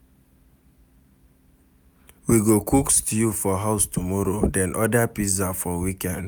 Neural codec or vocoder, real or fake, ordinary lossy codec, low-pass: none; real; none; none